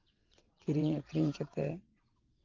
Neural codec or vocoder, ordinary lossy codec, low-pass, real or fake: vocoder, 22.05 kHz, 80 mel bands, WaveNeXt; Opus, 32 kbps; 7.2 kHz; fake